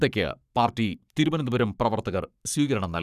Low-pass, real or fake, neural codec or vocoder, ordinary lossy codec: 14.4 kHz; fake; codec, 44.1 kHz, 7.8 kbps, Pupu-Codec; none